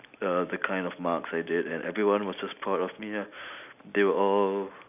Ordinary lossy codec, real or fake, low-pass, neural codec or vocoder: none; real; 3.6 kHz; none